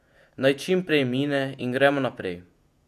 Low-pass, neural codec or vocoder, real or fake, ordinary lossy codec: 14.4 kHz; vocoder, 48 kHz, 128 mel bands, Vocos; fake; none